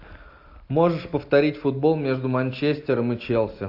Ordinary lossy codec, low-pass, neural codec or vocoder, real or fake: AAC, 48 kbps; 5.4 kHz; none; real